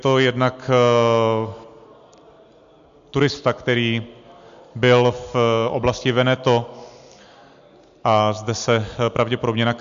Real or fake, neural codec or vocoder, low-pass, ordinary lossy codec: real; none; 7.2 kHz; MP3, 64 kbps